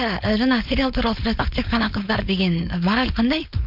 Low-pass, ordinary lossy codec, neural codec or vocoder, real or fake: 5.4 kHz; MP3, 48 kbps; codec, 16 kHz, 4.8 kbps, FACodec; fake